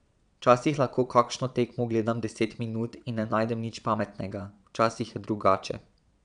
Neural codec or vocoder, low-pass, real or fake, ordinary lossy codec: vocoder, 22.05 kHz, 80 mel bands, Vocos; 9.9 kHz; fake; none